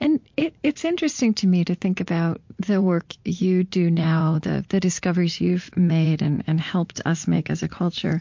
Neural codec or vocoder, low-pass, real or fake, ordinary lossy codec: vocoder, 44.1 kHz, 80 mel bands, Vocos; 7.2 kHz; fake; MP3, 48 kbps